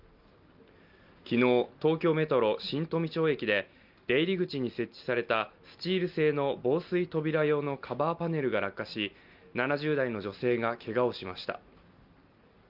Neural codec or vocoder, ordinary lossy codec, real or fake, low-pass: none; Opus, 24 kbps; real; 5.4 kHz